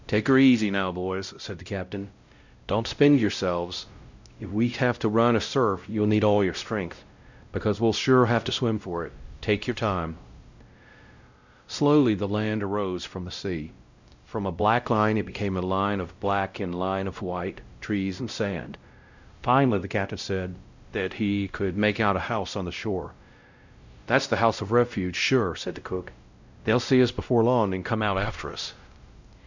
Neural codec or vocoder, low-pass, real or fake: codec, 16 kHz, 0.5 kbps, X-Codec, WavLM features, trained on Multilingual LibriSpeech; 7.2 kHz; fake